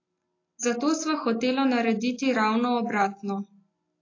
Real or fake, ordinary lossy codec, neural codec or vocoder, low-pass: real; AAC, 32 kbps; none; 7.2 kHz